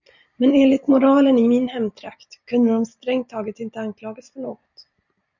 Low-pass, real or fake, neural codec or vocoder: 7.2 kHz; real; none